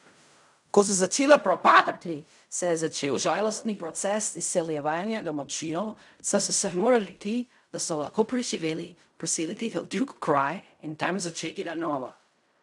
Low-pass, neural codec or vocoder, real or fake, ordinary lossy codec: 10.8 kHz; codec, 16 kHz in and 24 kHz out, 0.4 kbps, LongCat-Audio-Codec, fine tuned four codebook decoder; fake; none